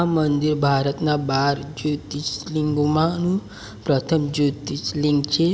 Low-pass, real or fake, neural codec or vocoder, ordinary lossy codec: none; real; none; none